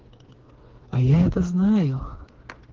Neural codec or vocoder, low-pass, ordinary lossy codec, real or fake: codec, 16 kHz, 4 kbps, FreqCodec, smaller model; 7.2 kHz; Opus, 16 kbps; fake